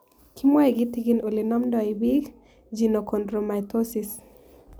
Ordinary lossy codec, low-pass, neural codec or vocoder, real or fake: none; none; none; real